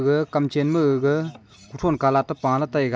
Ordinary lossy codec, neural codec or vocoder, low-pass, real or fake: none; none; none; real